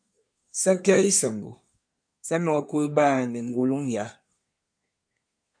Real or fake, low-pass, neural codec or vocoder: fake; 9.9 kHz; codec, 24 kHz, 1 kbps, SNAC